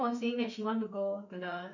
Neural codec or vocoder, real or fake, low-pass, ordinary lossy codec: autoencoder, 48 kHz, 32 numbers a frame, DAC-VAE, trained on Japanese speech; fake; 7.2 kHz; none